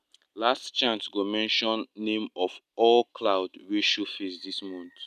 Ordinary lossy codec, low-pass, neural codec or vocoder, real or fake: none; 14.4 kHz; none; real